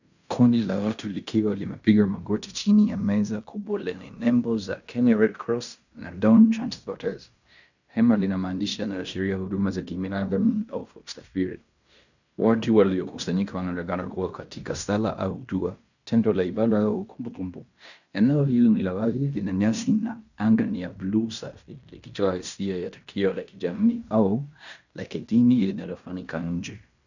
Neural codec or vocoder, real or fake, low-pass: codec, 16 kHz in and 24 kHz out, 0.9 kbps, LongCat-Audio-Codec, fine tuned four codebook decoder; fake; 7.2 kHz